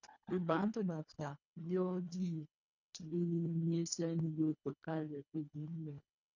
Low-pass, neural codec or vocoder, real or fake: 7.2 kHz; codec, 24 kHz, 1.5 kbps, HILCodec; fake